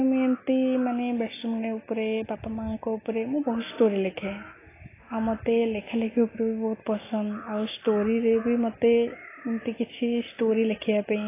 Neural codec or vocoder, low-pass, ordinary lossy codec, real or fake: none; 3.6 kHz; AAC, 16 kbps; real